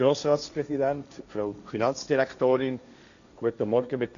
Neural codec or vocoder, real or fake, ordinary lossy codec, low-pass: codec, 16 kHz, 1.1 kbps, Voila-Tokenizer; fake; none; 7.2 kHz